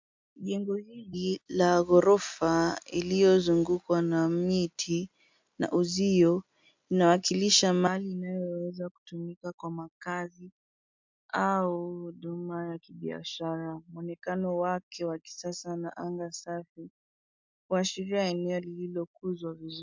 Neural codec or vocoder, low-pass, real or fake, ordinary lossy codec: none; 7.2 kHz; real; MP3, 64 kbps